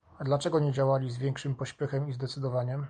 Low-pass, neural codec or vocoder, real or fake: 9.9 kHz; none; real